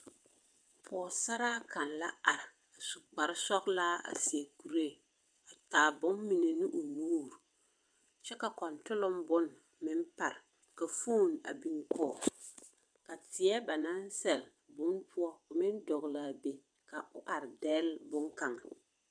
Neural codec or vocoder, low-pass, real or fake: vocoder, 22.05 kHz, 80 mel bands, WaveNeXt; 9.9 kHz; fake